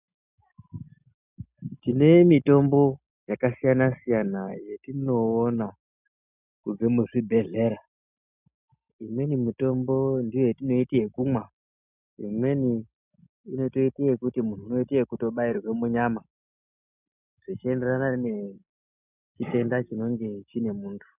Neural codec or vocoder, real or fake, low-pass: none; real; 3.6 kHz